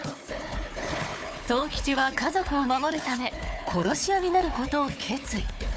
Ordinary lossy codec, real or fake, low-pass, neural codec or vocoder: none; fake; none; codec, 16 kHz, 4 kbps, FunCodec, trained on Chinese and English, 50 frames a second